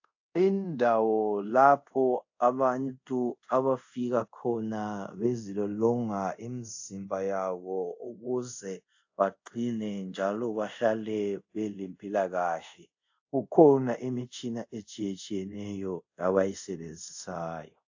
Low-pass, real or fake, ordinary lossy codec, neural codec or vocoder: 7.2 kHz; fake; AAC, 48 kbps; codec, 24 kHz, 0.5 kbps, DualCodec